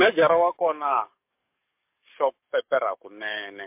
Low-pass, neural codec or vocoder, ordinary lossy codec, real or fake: 3.6 kHz; codec, 44.1 kHz, 7.8 kbps, DAC; MP3, 32 kbps; fake